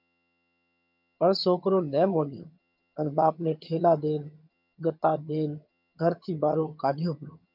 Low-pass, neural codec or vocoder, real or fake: 5.4 kHz; vocoder, 22.05 kHz, 80 mel bands, HiFi-GAN; fake